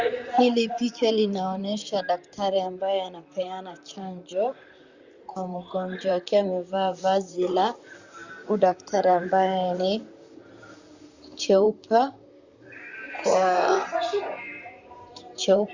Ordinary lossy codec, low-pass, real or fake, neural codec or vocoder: Opus, 64 kbps; 7.2 kHz; fake; vocoder, 44.1 kHz, 128 mel bands, Pupu-Vocoder